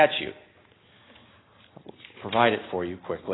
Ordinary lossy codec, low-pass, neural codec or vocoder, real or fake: AAC, 16 kbps; 7.2 kHz; none; real